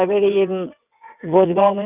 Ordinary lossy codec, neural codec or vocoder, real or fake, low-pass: none; vocoder, 22.05 kHz, 80 mel bands, WaveNeXt; fake; 3.6 kHz